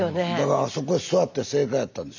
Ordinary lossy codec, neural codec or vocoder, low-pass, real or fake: none; none; 7.2 kHz; real